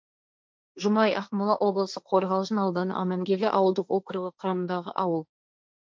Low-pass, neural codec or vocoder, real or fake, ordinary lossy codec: none; codec, 16 kHz, 1.1 kbps, Voila-Tokenizer; fake; none